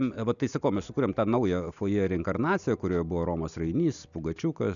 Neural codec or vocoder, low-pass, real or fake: none; 7.2 kHz; real